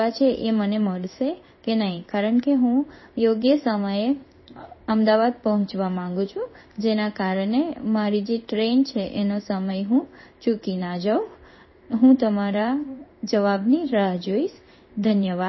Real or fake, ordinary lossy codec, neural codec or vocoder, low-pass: fake; MP3, 24 kbps; codec, 16 kHz in and 24 kHz out, 1 kbps, XY-Tokenizer; 7.2 kHz